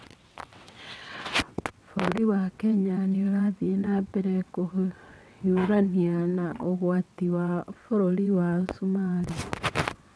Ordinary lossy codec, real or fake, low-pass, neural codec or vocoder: none; fake; none; vocoder, 22.05 kHz, 80 mel bands, WaveNeXt